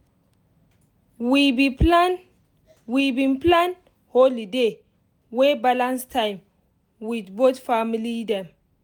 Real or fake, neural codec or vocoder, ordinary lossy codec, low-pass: real; none; none; none